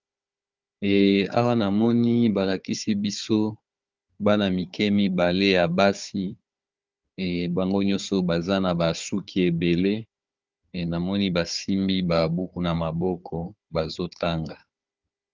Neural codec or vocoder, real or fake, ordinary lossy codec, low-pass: codec, 16 kHz, 4 kbps, FunCodec, trained on Chinese and English, 50 frames a second; fake; Opus, 24 kbps; 7.2 kHz